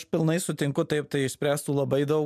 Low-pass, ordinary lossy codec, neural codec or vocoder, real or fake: 14.4 kHz; MP3, 96 kbps; none; real